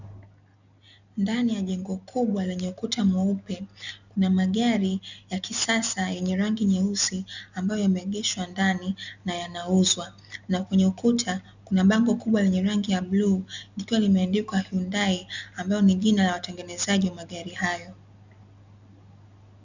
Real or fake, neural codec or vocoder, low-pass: real; none; 7.2 kHz